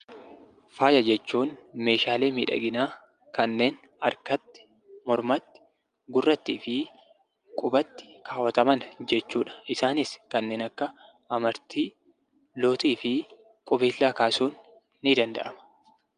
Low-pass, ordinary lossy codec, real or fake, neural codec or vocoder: 9.9 kHz; Opus, 32 kbps; real; none